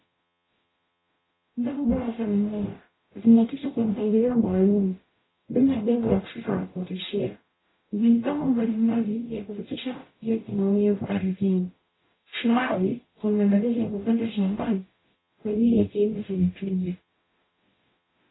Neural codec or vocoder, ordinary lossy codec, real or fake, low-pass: codec, 44.1 kHz, 0.9 kbps, DAC; AAC, 16 kbps; fake; 7.2 kHz